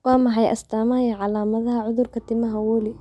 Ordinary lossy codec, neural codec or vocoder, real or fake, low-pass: none; none; real; none